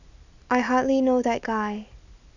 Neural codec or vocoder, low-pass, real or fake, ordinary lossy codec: none; 7.2 kHz; real; none